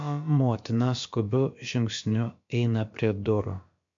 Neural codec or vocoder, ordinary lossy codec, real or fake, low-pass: codec, 16 kHz, about 1 kbps, DyCAST, with the encoder's durations; MP3, 48 kbps; fake; 7.2 kHz